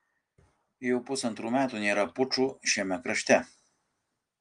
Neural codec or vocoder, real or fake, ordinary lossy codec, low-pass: none; real; Opus, 32 kbps; 9.9 kHz